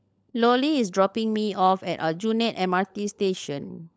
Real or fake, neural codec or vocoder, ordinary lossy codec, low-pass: fake; codec, 16 kHz, 16 kbps, FunCodec, trained on LibriTTS, 50 frames a second; none; none